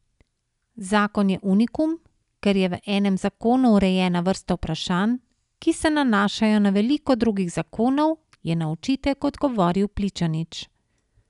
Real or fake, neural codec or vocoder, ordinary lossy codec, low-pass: real; none; none; 10.8 kHz